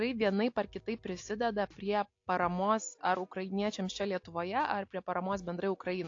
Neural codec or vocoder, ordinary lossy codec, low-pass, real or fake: none; AAC, 48 kbps; 7.2 kHz; real